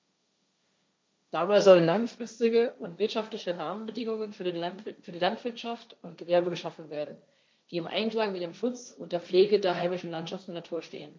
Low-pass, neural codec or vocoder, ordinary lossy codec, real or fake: none; codec, 16 kHz, 1.1 kbps, Voila-Tokenizer; none; fake